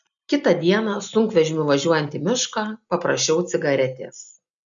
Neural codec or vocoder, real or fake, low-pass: none; real; 7.2 kHz